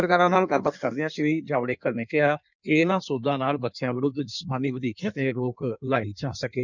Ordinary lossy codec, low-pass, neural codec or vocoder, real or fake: none; 7.2 kHz; codec, 16 kHz in and 24 kHz out, 1.1 kbps, FireRedTTS-2 codec; fake